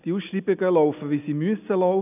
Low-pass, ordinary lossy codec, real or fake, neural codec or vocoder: 3.6 kHz; none; real; none